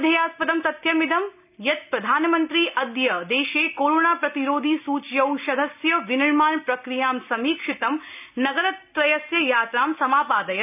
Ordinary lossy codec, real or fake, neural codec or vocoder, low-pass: none; real; none; 3.6 kHz